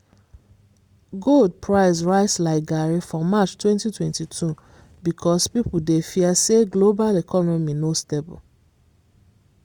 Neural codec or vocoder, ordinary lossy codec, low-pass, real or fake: none; Opus, 64 kbps; 19.8 kHz; real